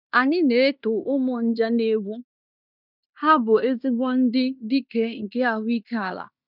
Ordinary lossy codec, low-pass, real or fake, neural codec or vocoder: none; 5.4 kHz; fake; codec, 16 kHz in and 24 kHz out, 0.9 kbps, LongCat-Audio-Codec, fine tuned four codebook decoder